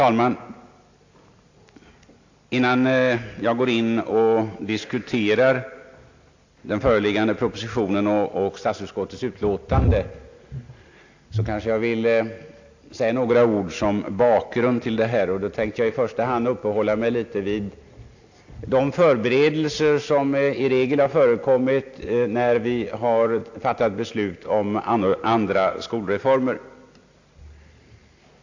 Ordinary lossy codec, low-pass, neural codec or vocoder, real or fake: AAC, 48 kbps; 7.2 kHz; none; real